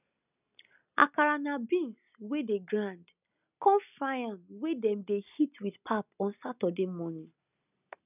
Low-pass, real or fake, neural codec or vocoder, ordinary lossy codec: 3.6 kHz; real; none; none